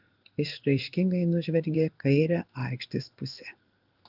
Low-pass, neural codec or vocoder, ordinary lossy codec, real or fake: 5.4 kHz; codec, 16 kHz in and 24 kHz out, 1 kbps, XY-Tokenizer; Opus, 24 kbps; fake